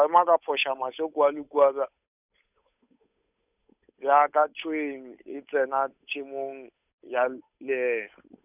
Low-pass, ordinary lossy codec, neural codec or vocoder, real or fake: 3.6 kHz; none; codec, 16 kHz, 8 kbps, FunCodec, trained on Chinese and English, 25 frames a second; fake